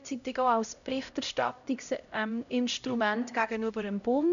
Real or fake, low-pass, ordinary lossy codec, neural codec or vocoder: fake; 7.2 kHz; none; codec, 16 kHz, 0.5 kbps, X-Codec, HuBERT features, trained on LibriSpeech